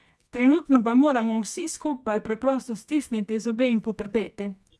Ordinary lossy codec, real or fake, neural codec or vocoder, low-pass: none; fake; codec, 24 kHz, 0.9 kbps, WavTokenizer, medium music audio release; none